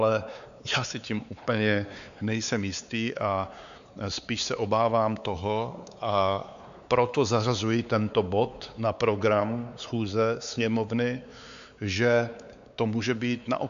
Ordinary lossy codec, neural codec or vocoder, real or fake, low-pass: AAC, 96 kbps; codec, 16 kHz, 4 kbps, X-Codec, WavLM features, trained on Multilingual LibriSpeech; fake; 7.2 kHz